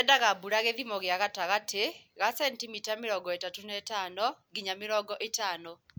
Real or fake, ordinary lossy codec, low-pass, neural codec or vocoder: real; none; none; none